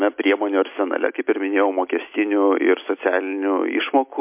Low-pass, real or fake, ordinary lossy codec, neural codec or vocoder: 3.6 kHz; real; MP3, 32 kbps; none